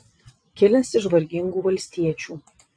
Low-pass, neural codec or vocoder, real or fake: 9.9 kHz; vocoder, 22.05 kHz, 80 mel bands, WaveNeXt; fake